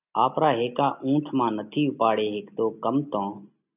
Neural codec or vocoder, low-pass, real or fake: none; 3.6 kHz; real